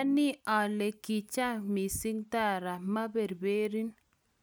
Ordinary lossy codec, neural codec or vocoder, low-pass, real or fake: none; none; none; real